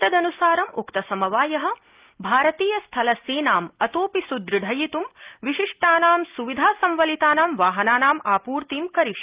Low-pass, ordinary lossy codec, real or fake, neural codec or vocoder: 3.6 kHz; Opus, 32 kbps; real; none